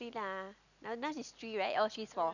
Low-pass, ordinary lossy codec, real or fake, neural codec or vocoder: 7.2 kHz; none; real; none